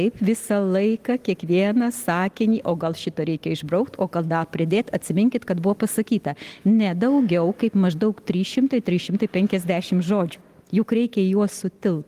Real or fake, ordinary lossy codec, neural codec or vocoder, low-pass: real; Opus, 24 kbps; none; 14.4 kHz